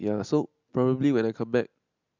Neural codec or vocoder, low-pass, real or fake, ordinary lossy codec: none; 7.2 kHz; real; MP3, 64 kbps